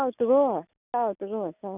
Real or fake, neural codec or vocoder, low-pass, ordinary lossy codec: real; none; 3.6 kHz; none